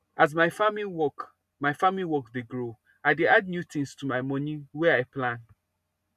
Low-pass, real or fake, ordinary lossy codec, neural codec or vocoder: 14.4 kHz; real; none; none